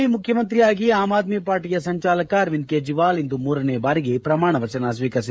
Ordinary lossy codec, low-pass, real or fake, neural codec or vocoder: none; none; fake; codec, 16 kHz, 16 kbps, FreqCodec, smaller model